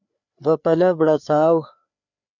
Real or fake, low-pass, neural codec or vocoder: fake; 7.2 kHz; codec, 16 kHz, 4 kbps, FreqCodec, larger model